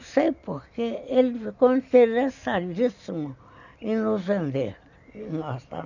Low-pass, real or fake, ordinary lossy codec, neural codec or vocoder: 7.2 kHz; real; none; none